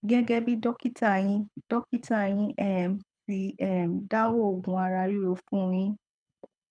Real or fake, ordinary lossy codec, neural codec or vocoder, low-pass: fake; none; codec, 24 kHz, 6 kbps, HILCodec; 9.9 kHz